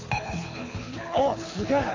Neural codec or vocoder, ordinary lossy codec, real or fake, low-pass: codec, 24 kHz, 6 kbps, HILCodec; AAC, 32 kbps; fake; 7.2 kHz